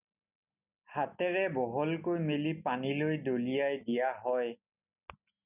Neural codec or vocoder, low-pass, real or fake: none; 3.6 kHz; real